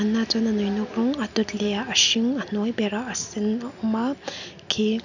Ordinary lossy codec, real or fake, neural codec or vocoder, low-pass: none; real; none; 7.2 kHz